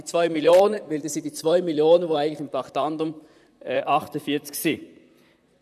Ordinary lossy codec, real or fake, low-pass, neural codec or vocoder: none; fake; 14.4 kHz; vocoder, 44.1 kHz, 128 mel bands, Pupu-Vocoder